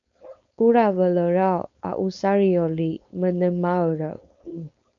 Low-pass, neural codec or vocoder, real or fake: 7.2 kHz; codec, 16 kHz, 4.8 kbps, FACodec; fake